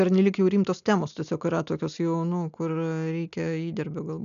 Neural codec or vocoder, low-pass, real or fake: none; 7.2 kHz; real